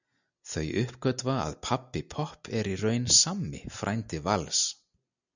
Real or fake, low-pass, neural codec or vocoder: real; 7.2 kHz; none